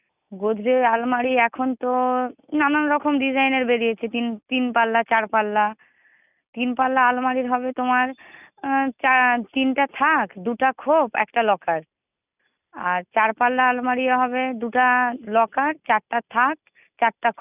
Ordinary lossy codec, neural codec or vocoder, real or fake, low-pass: none; none; real; 3.6 kHz